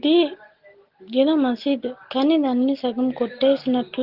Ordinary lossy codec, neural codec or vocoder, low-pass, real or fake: Opus, 16 kbps; none; 5.4 kHz; real